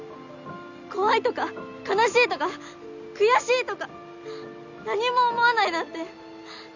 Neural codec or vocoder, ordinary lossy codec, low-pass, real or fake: none; none; 7.2 kHz; real